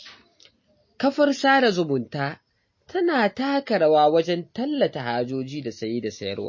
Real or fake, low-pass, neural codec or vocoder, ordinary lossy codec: real; 7.2 kHz; none; MP3, 32 kbps